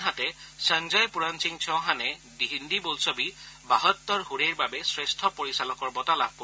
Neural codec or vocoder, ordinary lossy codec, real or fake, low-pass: none; none; real; none